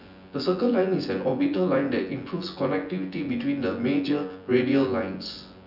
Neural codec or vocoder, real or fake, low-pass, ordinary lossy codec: vocoder, 24 kHz, 100 mel bands, Vocos; fake; 5.4 kHz; none